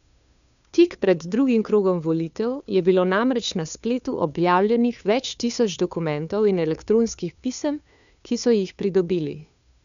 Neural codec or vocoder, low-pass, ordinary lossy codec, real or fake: codec, 16 kHz, 2 kbps, FunCodec, trained on Chinese and English, 25 frames a second; 7.2 kHz; none; fake